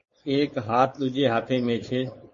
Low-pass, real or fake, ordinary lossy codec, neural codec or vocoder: 7.2 kHz; fake; MP3, 32 kbps; codec, 16 kHz, 4.8 kbps, FACodec